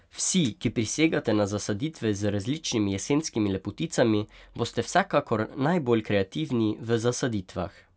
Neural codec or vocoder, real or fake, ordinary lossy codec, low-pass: none; real; none; none